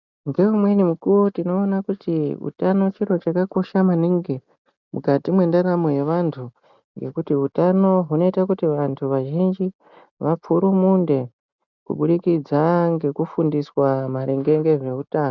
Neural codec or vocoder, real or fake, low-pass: none; real; 7.2 kHz